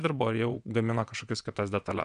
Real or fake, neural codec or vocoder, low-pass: fake; vocoder, 22.05 kHz, 80 mel bands, WaveNeXt; 9.9 kHz